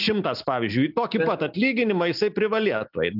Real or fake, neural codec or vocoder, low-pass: real; none; 5.4 kHz